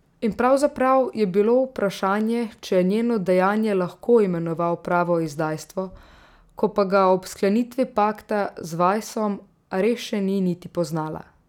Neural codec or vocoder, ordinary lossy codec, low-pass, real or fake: none; none; 19.8 kHz; real